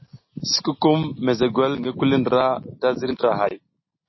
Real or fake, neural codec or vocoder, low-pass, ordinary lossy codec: real; none; 7.2 kHz; MP3, 24 kbps